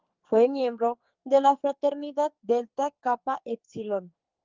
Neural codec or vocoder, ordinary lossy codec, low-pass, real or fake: codec, 44.1 kHz, 2.6 kbps, SNAC; Opus, 24 kbps; 7.2 kHz; fake